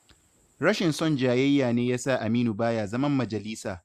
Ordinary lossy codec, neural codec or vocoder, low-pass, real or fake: Opus, 64 kbps; none; 14.4 kHz; real